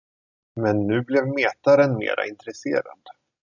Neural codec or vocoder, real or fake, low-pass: none; real; 7.2 kHz